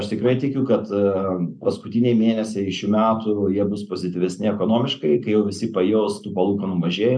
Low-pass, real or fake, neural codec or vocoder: 9.9 kHz; real; none